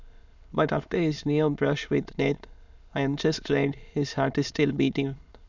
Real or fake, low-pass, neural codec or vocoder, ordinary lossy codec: fake; 7.2 kHz; autoencoder, 22.05 kHz, a latent of 192 numbers a frame, VITS, trained on many speakers; none